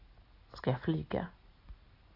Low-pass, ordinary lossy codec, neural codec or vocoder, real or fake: 5.4 kHz; AAC, 24 kbps; none; real